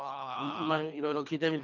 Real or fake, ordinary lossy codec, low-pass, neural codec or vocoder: fake; none; 7.2 kHz; codec, 24 kHz, 3 kbps, HILCodec